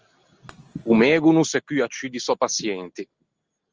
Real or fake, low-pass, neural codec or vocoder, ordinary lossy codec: real; 7.2 kHz; none; Opus, 24 kbps